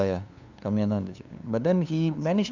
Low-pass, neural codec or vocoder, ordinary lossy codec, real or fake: 7.2 kHz; codec, 16 kHz, 2 kbps, FunCodec, trained on LibriTTS, 25 frames a second; none; fake